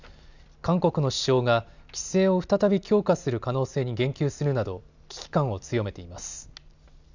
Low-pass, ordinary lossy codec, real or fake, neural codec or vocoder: 7.2 kHz; none; real; none